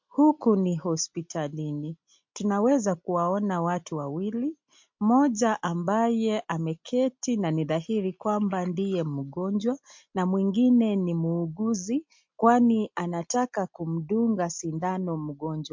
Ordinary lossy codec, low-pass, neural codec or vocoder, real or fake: MP3, 48 kbps; 7.2 kHz; none; real